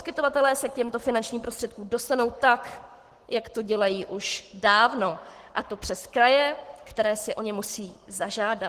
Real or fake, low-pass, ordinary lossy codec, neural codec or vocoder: fake; 14.4 kHz; Opus, 16 kbps; codec, 44.1 kHz, 7.8 kbps, Pupu-Codec